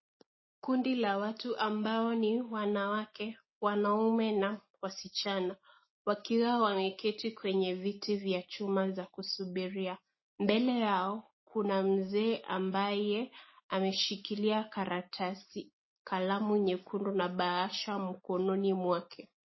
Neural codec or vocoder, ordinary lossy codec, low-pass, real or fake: none; MP3, 24 kbps; 7.2 kHz; real